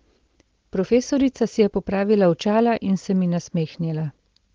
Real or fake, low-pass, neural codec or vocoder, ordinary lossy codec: real; 7.2 kHz; none; Opus, 16 kbps